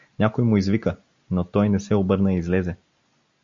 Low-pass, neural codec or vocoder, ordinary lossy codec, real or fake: 7.2 kHz; none; MP3, 48 kbps; real